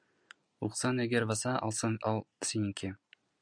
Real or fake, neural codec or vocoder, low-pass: real; none; 9.9 kHz